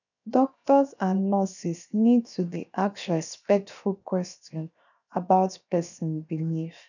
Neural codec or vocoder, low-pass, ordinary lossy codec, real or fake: codec, 16 kHz, 0.7 kbps, FocalCodec; 7.2 kHz; none; fake